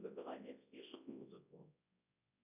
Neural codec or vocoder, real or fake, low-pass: codec, 24 kHz, 0.9 kbps, WavTokenizer, large speech release; fake; 3.6 kHz